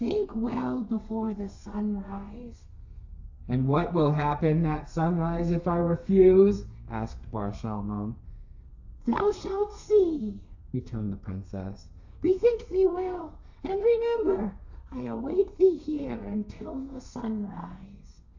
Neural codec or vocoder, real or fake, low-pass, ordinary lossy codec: codec, 32 kHz, 1.9 kbps, SNAC; fake; 7.2 kHz; AAC, 48 kbps